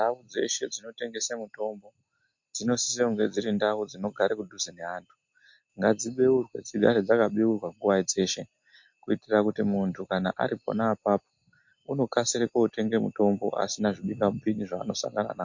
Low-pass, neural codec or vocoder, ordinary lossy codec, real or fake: 7.2 kHz; none; MP3, 48 kbps; real